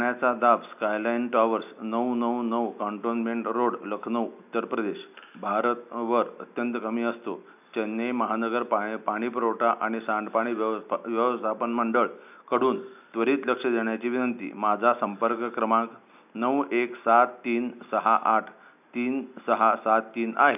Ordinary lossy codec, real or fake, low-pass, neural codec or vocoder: none; real; 3.6 kHz; none